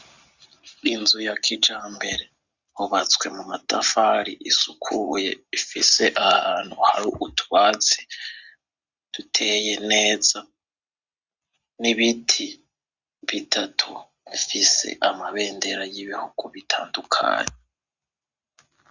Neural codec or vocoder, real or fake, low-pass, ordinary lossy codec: none; real; 7.2 kHz; Opus, 64 kbps